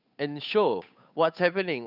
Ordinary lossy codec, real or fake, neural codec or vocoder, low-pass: none; fake; codec, 16 kHz, 8 kbps, FunCodec, trained on Chinese and English, 25 frames a second; 5.4 kHz